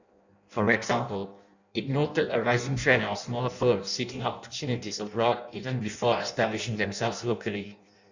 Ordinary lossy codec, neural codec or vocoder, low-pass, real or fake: none; codec, 16 kHz in and 24 kHz out, 0.6 kbps, FireRedTTS-2 codec; 7.2 kHz; fake